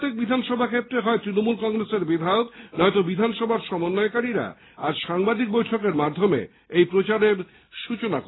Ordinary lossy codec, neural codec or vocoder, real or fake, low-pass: AAC, 16 kbps; none; real; 7.2 kHz